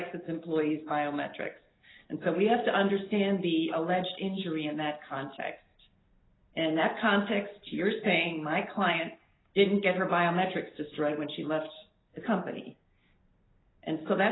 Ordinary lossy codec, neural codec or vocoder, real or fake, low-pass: AAC, 16 kbps; none; real; 7.2 kHz